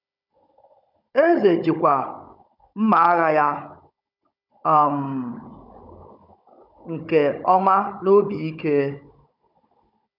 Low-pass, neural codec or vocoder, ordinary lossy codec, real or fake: 5.4 kHz; codec, 16 kHz, 16 kbps, FunCodec, trained on Chinese and English, 50 frames a second; none; fake